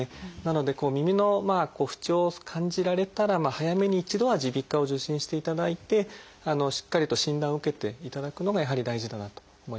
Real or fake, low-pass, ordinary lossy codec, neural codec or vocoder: real; none; none; none